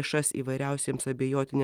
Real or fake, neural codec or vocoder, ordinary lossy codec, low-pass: real; none; Opus, 32 kbps; 19.8 kHz